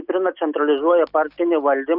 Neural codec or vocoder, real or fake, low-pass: none; real; 5.4 kHz